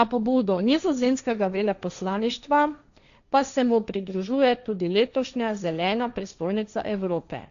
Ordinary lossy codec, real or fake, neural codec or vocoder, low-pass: none; fake; codec, 16 kHz, 1.1 kbps, Voila-Tokenizer; 7.2 kHz